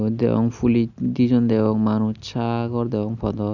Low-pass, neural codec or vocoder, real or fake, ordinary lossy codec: 7.2 kHz; none; real; none